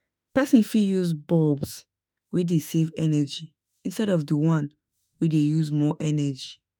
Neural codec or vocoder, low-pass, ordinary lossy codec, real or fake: autoencoder, 48 kHz, 32 numbers a frame, DAC-VAE, trained on Japanese speech; none; none; fake